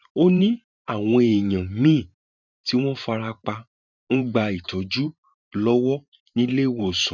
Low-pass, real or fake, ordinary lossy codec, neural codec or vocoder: 7.2 kHz; fake; none; vocoder, 44.1 kHz, 128 mel bands every 512 samples, BigVGAN v2